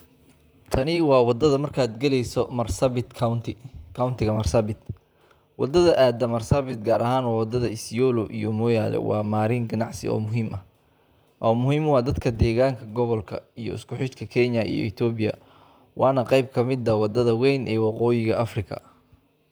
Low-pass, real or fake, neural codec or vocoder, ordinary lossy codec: none; fake; vocoder, 44.1 kHz, 128 mel bands, Pupu-Vocoder; none